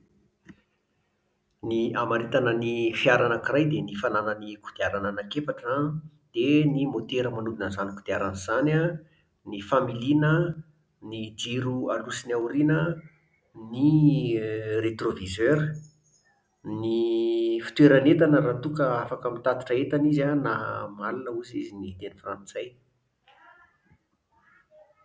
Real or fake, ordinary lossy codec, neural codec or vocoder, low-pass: real; none; none; none